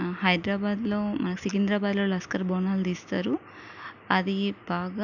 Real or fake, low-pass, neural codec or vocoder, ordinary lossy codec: real; 7.2 kHz; none; none